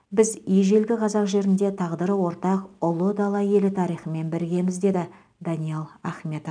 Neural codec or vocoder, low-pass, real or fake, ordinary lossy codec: none; 9.9 kHz; real; none